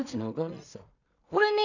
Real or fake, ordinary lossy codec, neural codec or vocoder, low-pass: fake; none; codec, 16 kHz in and 24 kHz out, 0.4 kbps, LongCat-Audio-Codec, two codebook decoder; 7.2 kHz